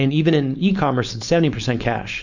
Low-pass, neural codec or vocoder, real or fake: 7.2 kHz; codec, 16 kHz, 4.8 kbps, FACodec; fake